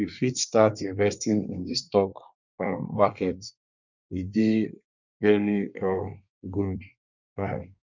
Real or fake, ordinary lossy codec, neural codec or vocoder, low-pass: fake; none; codec, 24 kHz, 1 kbps, SNAC; 7.2 kHz